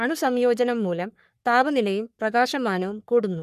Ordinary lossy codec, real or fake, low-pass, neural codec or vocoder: none; fake; 14.4 kHz; codec, 44.1 kHz, 3.4 kbps, Pupu-Codec